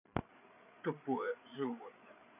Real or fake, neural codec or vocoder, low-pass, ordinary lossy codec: fake; codec, 16 kHz, 8 kbps, FreqCodec, larger model; 3.6 kHz; none